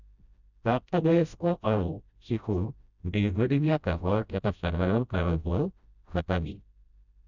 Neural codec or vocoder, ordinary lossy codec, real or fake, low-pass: codec, 16 kHz, 0.5 kbps, FreqCodec, smaller model; none; fake; 7.2 kHz